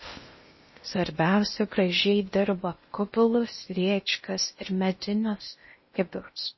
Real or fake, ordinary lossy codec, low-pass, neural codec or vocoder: fake; MP3, 24 kbps; 7.2 kHz; codec, 16 kHz in and 24 kHz out, 0.6 kbps, FocalCodec, streaming, 2048 codes